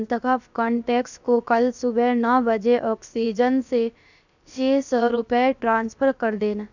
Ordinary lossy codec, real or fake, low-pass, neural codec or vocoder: none; fake; 7.2 kHz; codec, 16 kHz, about 1 kbps, DyCAST, with the encoder's durations